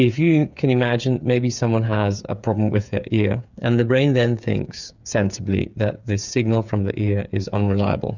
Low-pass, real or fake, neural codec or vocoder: 7.2 kHz; fake; codec, 16 kHz, 8 kbps, FreqCodec, smaller model